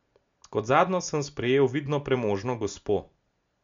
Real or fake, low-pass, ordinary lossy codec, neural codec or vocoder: real; 7.2 kHz; MP3, 64 kbps; none